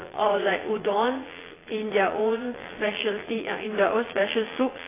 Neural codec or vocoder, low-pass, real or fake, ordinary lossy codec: vocoder, 22.05 kHz, 80 mel bands, Vocos; 3.6 kHz; fake; AAC, 16 kbps